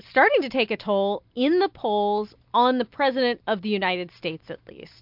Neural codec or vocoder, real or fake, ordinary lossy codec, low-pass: none; real; MP3, 48 kbps; 5.4 kHz